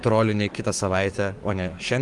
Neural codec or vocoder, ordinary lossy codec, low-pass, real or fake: codec, 44.1 kHz, 7.8 kbps, DAC; Opus, 32 kbps; 10.8 kHz; fake